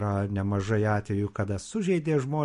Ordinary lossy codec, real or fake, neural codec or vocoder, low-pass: MP3, 48 kbps; real; none; 10.8 kHz